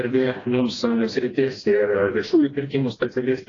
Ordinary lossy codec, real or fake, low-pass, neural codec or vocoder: AAC, 32 kbps; fake; 7.2 kHz; codec, 16 kHz, 1 kbps, FreqCodec, smaller model